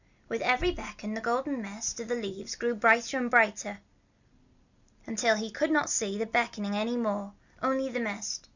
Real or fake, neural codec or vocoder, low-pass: real; none; 7.2 kHz